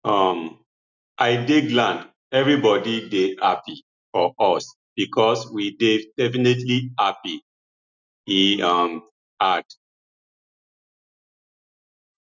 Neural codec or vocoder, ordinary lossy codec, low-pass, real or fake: none; none; 7.2 kHz; real